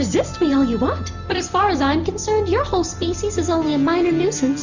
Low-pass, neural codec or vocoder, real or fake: 7.2 kHz; none; real